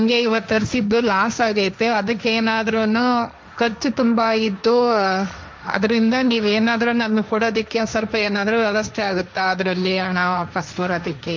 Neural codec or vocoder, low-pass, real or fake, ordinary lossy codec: codec, 16 kHz, 1.1 kbps, Voila-Tokenizer; none; fake; none